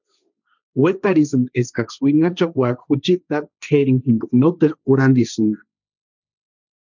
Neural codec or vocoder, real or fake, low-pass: codec, 16 kHz, 1.1 kbps, Voila-Tokenizer; fake; 7.2 kHz